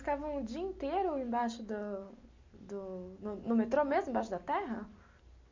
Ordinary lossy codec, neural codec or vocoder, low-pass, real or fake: MP3, 48 kbps; none; 7.2 kHz; real